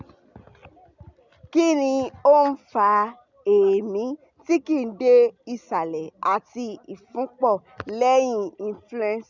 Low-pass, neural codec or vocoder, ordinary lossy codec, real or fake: 7.2 kHz; none; none; real